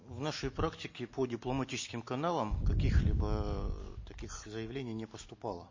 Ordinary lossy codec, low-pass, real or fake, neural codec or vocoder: MP3, 32 kbps; 7.2 kHz; real; none